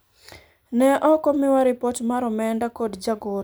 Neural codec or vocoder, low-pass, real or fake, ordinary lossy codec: none; none; real; none